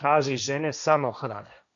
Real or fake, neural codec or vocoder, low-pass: fake; codec, 16 kHz, 1.1 kbps, Voila-Tokenizer; 7.2 kHz